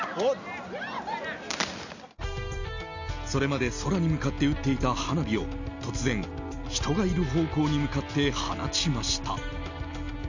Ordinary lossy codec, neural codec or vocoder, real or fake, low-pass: none; none; real; 7.2 kHz